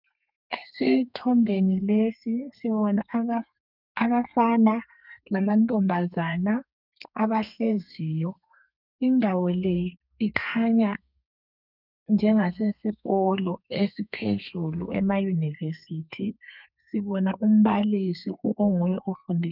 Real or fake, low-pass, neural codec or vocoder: fake; 5.4 kHz; codec, 32 kHz, 1.9 kbps, SNAC